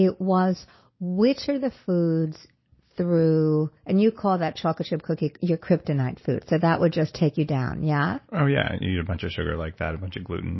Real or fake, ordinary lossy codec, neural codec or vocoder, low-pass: real; MP3, 24 kbps; none; 7.2 kHz